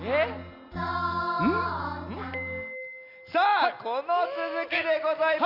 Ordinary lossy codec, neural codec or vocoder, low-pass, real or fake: none; none; 5.4 kHz; real